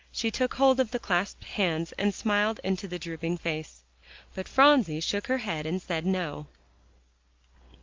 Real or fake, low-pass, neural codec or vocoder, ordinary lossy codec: real; 7.2 kHz; none; Opus, 24 kbps